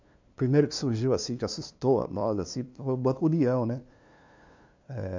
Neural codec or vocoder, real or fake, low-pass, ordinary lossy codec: codec, 16 kHz, 2 kbps, FunCodec, trained on LibriTTS, 25 frames a second; fake; 7.2 kHz; MP3, 48 kbps